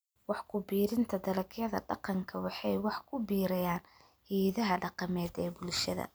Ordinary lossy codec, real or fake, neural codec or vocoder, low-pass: none; real; none; none